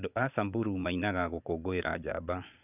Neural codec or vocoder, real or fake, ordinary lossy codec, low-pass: vocoder, 22.05 kHz, 80 mel bands, Vocos; fake; none; 3.6 kHz